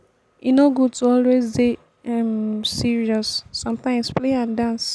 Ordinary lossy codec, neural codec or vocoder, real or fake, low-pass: none; none; real; none